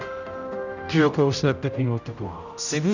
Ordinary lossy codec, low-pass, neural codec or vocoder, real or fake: none; 7.2 kHz; codec, 16 kHz, 0.5 kbps, X-Codec, HuBERT features, trained on general audio; fake